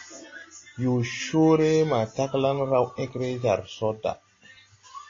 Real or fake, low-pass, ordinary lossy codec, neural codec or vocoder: real; 7.2 kHz; AAC, 32 kbps; none